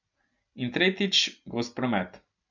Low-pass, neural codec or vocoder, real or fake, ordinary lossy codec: 7.2 kHz; none; real; none